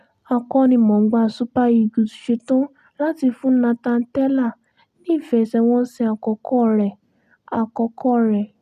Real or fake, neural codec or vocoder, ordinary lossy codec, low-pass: real; none; none; 14.4 kHz